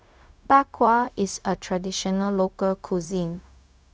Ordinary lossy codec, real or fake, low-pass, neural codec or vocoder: none; fake; none; codec, 16 kHz, 0.4 kbps, LongCat-Audio-Codec